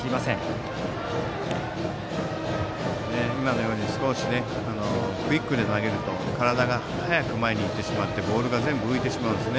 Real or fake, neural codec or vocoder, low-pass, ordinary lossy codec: real; none; none; none